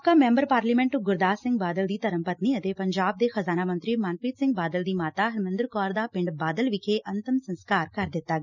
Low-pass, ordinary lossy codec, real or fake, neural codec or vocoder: 7.2 kHz; none; real; none